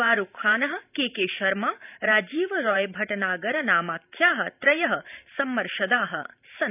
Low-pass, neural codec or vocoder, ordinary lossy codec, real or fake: 3.6 kHz; vocoder, 44.1 kHz, 128 mel bands every 512 samples, BigVGAN v2; none; fake